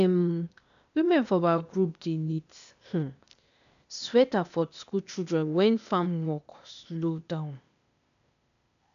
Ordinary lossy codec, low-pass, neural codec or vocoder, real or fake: none; 7.2 kHz; codec, 16 kHz, 0.8 kbps, ZipCodec; fake